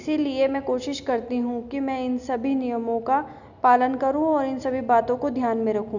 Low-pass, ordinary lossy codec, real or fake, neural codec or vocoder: 7.2 kHz; none; real; none